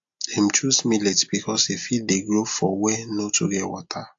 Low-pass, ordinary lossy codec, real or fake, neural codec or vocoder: 7.2 kHz; AAC, 64 kbps; real; none